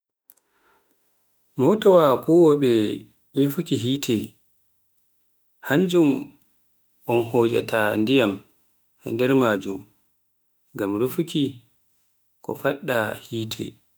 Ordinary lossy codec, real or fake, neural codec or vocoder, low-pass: none; fake; autoencoder, 48 kHz, 32 numbers a frame, DAC-VAE, trained on Japanese speech; none